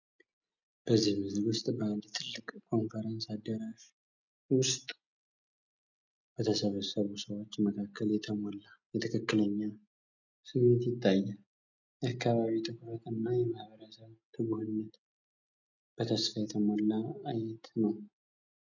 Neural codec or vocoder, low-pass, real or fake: none; 7.2 kHz; real